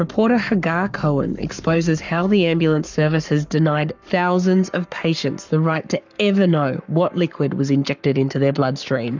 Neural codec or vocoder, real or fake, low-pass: codec, 44.1 kHz, 7.8 kbps, Pupu-Codec; fake; 7.2 kHz